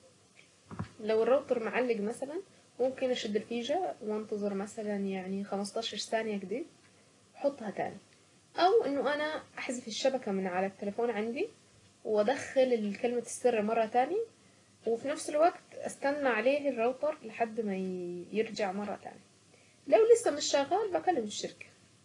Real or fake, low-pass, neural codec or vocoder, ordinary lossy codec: real; 10.8 kHz; none; AAC, 32 kbps